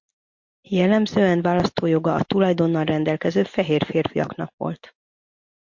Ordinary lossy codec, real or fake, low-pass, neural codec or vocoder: MP3, 48 kbps; real; 7.2 kHz; none